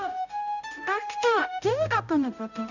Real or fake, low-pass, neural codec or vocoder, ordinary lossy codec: fake; 7.2 kHz; codec, 16 kHz, 0.5 kbps, X-Codec, HuBERT features, trained on balanced general audio; none